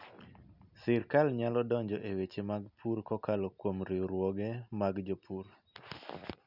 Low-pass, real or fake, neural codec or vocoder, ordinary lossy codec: 5.4 kHz; real; none; none